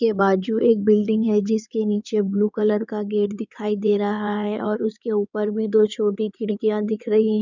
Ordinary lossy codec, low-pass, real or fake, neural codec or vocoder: none; 7.2 kHz; fake; codec, 16 kHz in and 24 kHz out, 2.2 kbps, FireRedTTS-2 codec